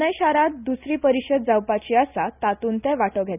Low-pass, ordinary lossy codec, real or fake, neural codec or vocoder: 3.6 kHz; none; real; none